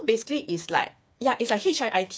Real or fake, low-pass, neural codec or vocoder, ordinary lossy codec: fake; none; codec, 16 kHz, 4 kbps, FreqCodec, smaller model; none